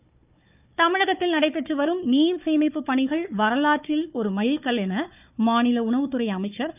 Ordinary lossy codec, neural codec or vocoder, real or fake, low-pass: none; codec, 16 kHz, 4 kbps, FunCodec, trained on Chinese and English, 50 frames a second; fake; 3.6 kHz